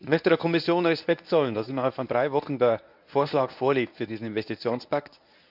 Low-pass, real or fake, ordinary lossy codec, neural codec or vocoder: 5.4 kHz; fake; none; codec, 24 kHz, 0.9 kbps, WavTokenizer, medium speech release version 1